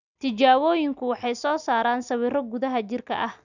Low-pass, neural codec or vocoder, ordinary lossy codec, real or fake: 7.2 kHz; none; none; real